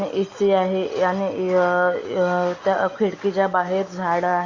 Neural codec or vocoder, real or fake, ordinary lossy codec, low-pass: none; real; Opus, 64 kbps; 7.2 kHz